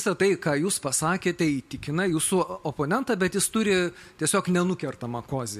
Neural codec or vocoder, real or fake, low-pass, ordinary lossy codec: none; real; 14.4 kHz; MP3, 64 kbps